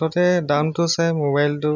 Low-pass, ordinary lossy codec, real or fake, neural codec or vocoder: 7.2 kHz; none; real; none